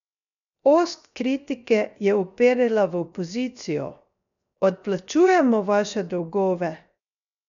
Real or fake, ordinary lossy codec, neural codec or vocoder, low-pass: fake; none; codec, 16 kHz, 0.7 kbps, FocalCodec; 7.2 kHz